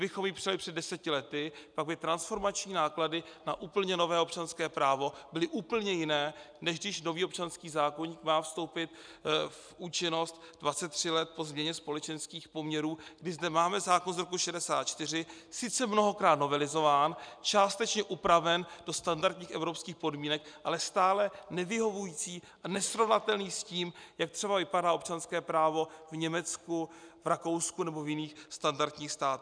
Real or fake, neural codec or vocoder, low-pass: real; none; 9.9 kHz